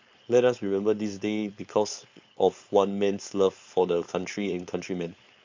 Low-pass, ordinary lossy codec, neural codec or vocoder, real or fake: 7.2 kHz; MP3, 64 kbps; codec, 16 kHz, 4.8 kbps, FACodec; fake